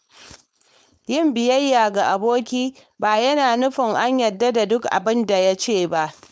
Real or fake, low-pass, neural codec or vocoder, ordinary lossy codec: fake; none; codec, 16 kHz, 4.8 kbps, FACodec; none